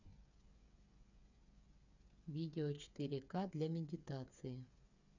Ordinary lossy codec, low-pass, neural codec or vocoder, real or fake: none; 7.2 kHz; codec, 16 kHz, 8 kbps, FreqCodec, smaller model; fake